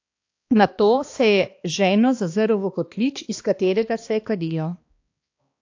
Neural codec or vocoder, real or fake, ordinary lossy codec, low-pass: codec, 16 kHz, 2 kbps, X-Codec, HuBERT features, trained on balanced general audio; fake; AAC, 48 kbps; 7.2 kHz